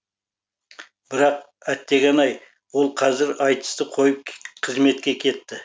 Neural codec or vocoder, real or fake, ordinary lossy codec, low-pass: none; real; none; none